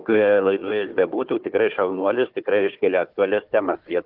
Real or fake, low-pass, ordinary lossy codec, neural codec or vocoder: fake; 5.4 kHz; Opus, 32 kbps; codec, 16 kHz, 4 kbps, FunCodec, trained on Chinese and English, 50 frames a second